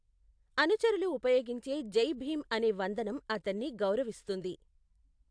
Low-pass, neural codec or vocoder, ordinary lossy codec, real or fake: 9.9 kHz; none; AAC, 64 kbps; real